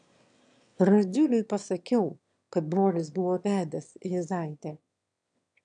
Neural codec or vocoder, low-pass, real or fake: autoencoder, 22.05 kHz, a latent of 192 numbers a frame, VITS, trained on one speaker; 9.9 kHz; fake